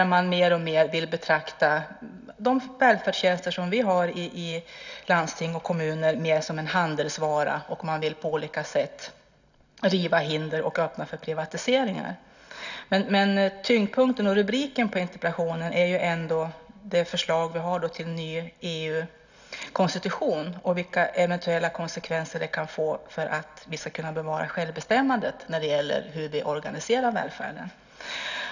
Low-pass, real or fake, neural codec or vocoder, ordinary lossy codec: 7.2 kHz; real; none; none